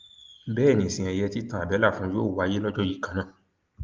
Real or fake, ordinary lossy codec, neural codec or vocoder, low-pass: real; Opus, 24 kbps; none; 7.2 kHz